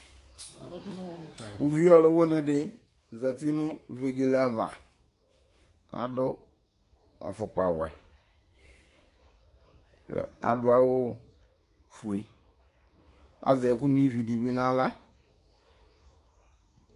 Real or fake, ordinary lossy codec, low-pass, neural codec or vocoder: fake; AAC, 48 kbps; 10.8 kHz; codec, 24 kHz, 1 kbps, SNAC